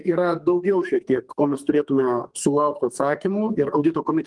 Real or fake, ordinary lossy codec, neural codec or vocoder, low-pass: fake; Opus, 24 kbps; codec, 44.1 kHz, 2.6 kbps, SNAC; 10.8 kHz